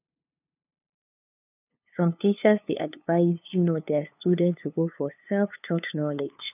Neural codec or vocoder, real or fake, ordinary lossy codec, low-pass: codec, 16 kHz, 2 kbps, FunCodec, trained on LibriTTS, 25 frames a second; fake; none; 3.6 kHz